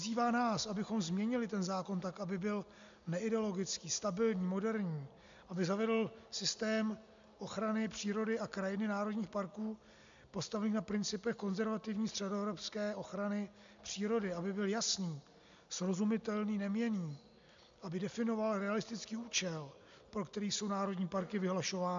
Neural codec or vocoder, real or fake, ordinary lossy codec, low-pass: none; real; MP3, 64 kbps; 7.2 kHz